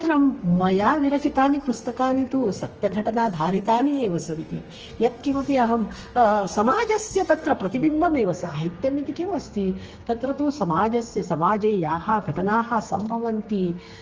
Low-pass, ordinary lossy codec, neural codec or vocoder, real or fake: 7.2 kHz; Opus, 24 kbps; codec, 32 kHz, 1.9 kbps, SNAC; fake